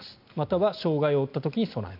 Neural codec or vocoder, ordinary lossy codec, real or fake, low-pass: none; none; real; 5.4 kHz